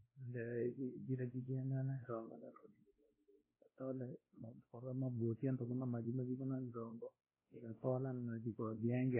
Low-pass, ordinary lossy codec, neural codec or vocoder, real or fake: 3.6 kHz; MP3, 16 kbps; codec, 16 kHz, 1 kbps, X-Codec, WavLM features, trained on Multilingual LibriSpeech; fake